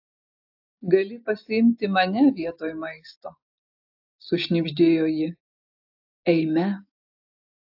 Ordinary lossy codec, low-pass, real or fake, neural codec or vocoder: AAC, 48 kbps; 5.4 kHz; real; none